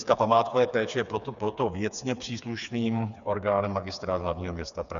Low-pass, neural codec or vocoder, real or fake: 7.2 kHz; codec, 16 kHz, 4 kbps, FreqCodec, smaller model; fake